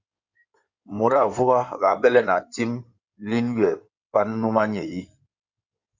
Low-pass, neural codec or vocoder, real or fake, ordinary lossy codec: 7.2 kHz; codec, 16 kHz in and 24 kHz out, 2.2 kbps, FireRedTTS-2 codec; fake; Opus, 64 kbps